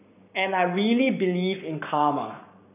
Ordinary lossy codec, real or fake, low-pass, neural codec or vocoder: none; fake; 3.6 kHz; codec, 44.1 kHz, 7.8 kbps, Pupu-Codec